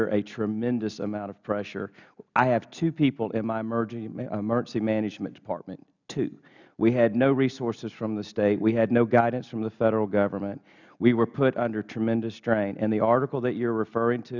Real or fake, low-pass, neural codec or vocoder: real; 7.2 kHz; none